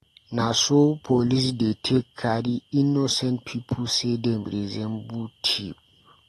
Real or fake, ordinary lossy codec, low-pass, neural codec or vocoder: real; AAC, 32 kbps; 19.8 kHz; none